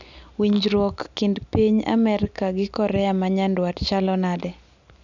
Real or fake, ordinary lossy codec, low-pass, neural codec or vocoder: real; none; 7.2 kHz; none